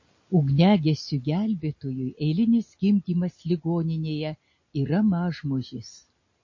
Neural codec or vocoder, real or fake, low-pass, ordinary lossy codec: none; real; 7.2 kHz; MP3, 32 kbps